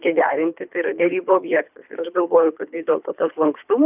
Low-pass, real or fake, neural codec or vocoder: 3.6 kHz; fake; codec, 24 kHz, 3 kbps, HILCodec